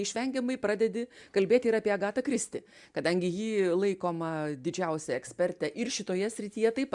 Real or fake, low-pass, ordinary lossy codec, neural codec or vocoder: real; 10.8 kHz; MP3, 96 kbps; none